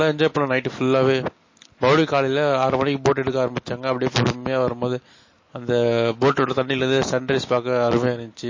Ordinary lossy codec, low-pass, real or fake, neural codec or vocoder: MP3, 32 kbps; 7.2 kHz; real; none